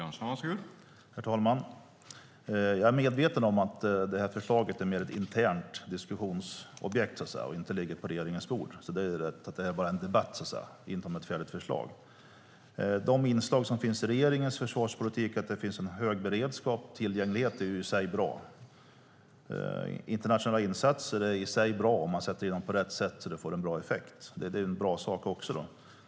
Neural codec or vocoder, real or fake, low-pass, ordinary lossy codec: none; real; none; none